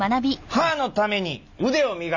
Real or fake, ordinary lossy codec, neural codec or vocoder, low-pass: real; none; none; 7.2 kHz